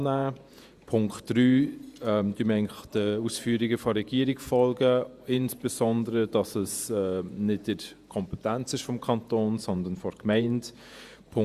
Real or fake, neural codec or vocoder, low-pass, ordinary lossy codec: fake; vocoder, 48 kHz, 128 mel bands, Vocos; 14.4 kHz; Opus, 64 kbps